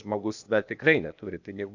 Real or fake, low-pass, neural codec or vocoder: fake; 7.2 kHz; codec, 16 kHz, 0.8 kbps, ZipCodec